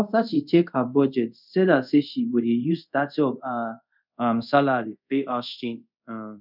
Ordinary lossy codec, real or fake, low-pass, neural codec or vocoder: none; fake; 5.4 kHz; codec, 24 kHz, 0.5 kbps, DualCodec